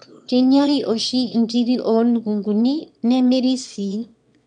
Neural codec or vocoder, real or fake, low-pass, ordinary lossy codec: autoencoder, 22.05 kHz, a latent of 192 numbers a frame, VITS, trained on one speaker; fake; 9.9 kHz; none